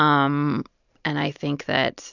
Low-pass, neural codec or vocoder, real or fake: 7.2 kHz; none; real